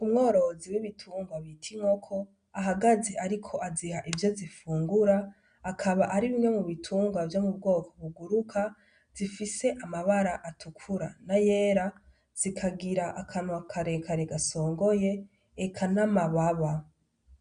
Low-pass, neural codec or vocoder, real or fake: 9.9 kHz; none; real